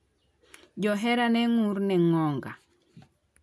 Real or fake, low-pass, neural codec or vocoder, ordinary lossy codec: real; none; none; none